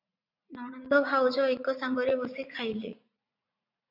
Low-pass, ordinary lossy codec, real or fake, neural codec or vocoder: 5.4 kHz; MP3, 48 kbps; fake; vocoder, 44.1 kHz, 80 mel bands, Vocos